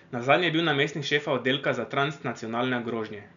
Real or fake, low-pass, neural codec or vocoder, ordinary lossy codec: real; 7.2 kHz; none; none